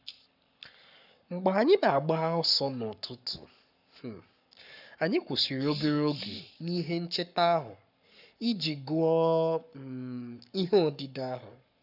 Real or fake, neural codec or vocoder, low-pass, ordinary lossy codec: fake; codec, 44.1 kHz, 7.8 kbps, Pupu-Codec; 5.4 kHz; none